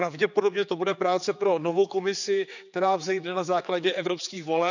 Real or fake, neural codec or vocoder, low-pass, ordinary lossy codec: fake; codec, 16 kHz, 4 kbps, X-Codec, HuBERT features, trained on general audio; 7.2 kHz; none